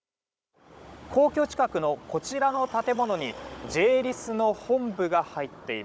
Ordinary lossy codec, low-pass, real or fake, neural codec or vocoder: none; none; fake; codec, 16 kHz, 16 kbps, FunCodec, trained on Chinese and English, 50 frames a second